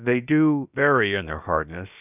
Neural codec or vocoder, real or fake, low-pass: codec, 16 kHz, about 1 kbps, DyCAST, with the encoder's durations; fake; 3.6 kHz